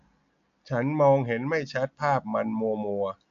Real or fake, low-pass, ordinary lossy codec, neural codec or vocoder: real; 7.2 kHz; AAC, 64 kbps; none